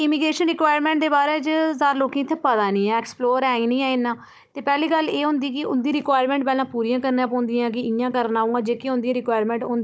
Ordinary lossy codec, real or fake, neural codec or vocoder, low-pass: none; fake; codec, 16 kHz, 16 kbps, FunCodec, trained on Chinese and English, 50 frames a second; none